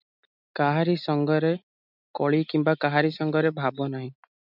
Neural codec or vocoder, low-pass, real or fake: none; 5.4 kHz; real